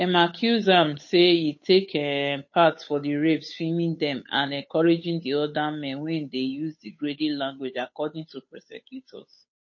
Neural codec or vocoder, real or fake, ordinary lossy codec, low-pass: codec, 16 kHz, 8 kbps, FunCodec, trained on Chinese and English, 25 frames a second; fake; MP3, 32 kbps; 7.2 kHz